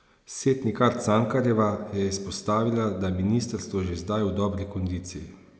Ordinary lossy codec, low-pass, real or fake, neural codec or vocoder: none; none; real; none